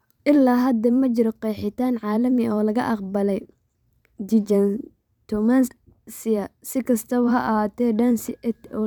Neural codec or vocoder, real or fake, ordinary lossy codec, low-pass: vocoder, 44.1 kHz, 128 mel bands every 512 samples, BigVGAN v2; fake; none; 19.8 kHz